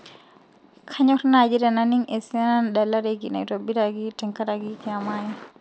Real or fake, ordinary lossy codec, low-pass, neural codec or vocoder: real; none; none; none